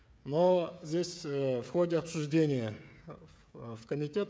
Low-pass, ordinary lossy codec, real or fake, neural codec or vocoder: none; none; fake; codec, 16 kHz, 16 kbps, FreqCodec, smaller model